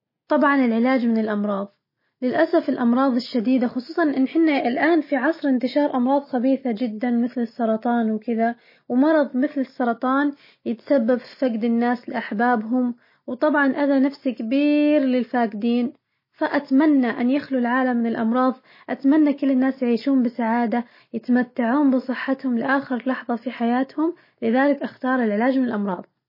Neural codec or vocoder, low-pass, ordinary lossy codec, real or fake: none; 5.4 kHz; MP3, 24 kbps; real